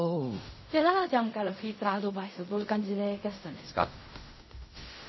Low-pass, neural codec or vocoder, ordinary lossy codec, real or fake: 7.2 kHz; codec, 16 kHz in and 24 kHz out, 0.4 kbps, LongCat-Audio-Codec, fine tuned four codebook decoder; MP3, 24 kbps; fake